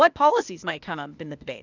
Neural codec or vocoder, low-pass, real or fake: codec, 16 kHz, 0.8 kbps, ZipCodec; 7.2 kHz; fake